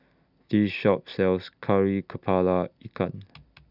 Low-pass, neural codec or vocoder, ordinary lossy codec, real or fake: 5.4 kHz; none; none; real